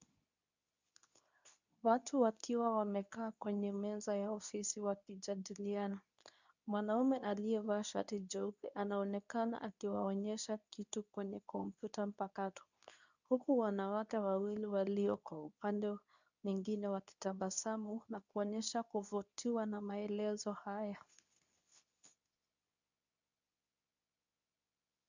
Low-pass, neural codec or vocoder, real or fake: 7.2 kHz; codec, 24 kHz, 0.9 kbps, WavTokenizer, medium speech release version 2; fake